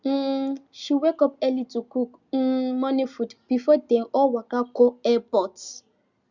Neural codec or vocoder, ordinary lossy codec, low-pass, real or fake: none; Opus, 64 kbps; 7.2 kHz; real